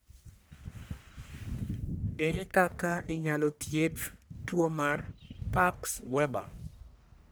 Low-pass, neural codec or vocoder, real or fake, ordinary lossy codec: none; codec, 44.1 kHz, 1.7 kbps, Pupu-Codec; fake; none